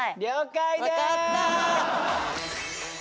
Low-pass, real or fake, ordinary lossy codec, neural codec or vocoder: none; real; none; none